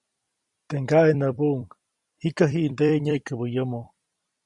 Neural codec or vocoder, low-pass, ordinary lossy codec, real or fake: vocoder, 44.1 kHz, 128 mel bands every 256 samples, BigVGAN v2; 10.8 kHz; Opus, 64 kbps; fake